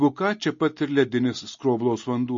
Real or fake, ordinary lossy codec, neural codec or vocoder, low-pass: real; MP3, 32 kbps; none; 7.2 kHz